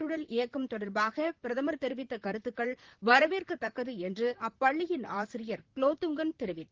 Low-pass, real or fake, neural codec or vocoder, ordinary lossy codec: 7.2 kHz; fake; vocoder, 44.1 kHz, 128 mel bands, Pupu-Vocoder; Opus, 16 kbps